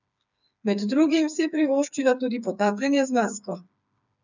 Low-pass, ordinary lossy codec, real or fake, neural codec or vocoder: 7.2 kHz; none; fake; codec, 16 kHz, 4 kbps, FreqCodec, smaller model